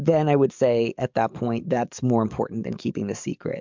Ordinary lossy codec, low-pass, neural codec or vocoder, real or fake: MP3, 64 kbps; 7.2 kHz; codec, 44.1 kHz, 7.8 kbps, DAC; fake